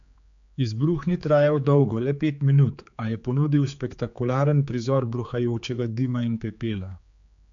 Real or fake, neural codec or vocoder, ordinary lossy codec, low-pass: fake; codec, 16 kHz, 4 kbps, X-Codec, HuBERT features, trained on general audio; MP3, 64 kbps; 7.2 kHz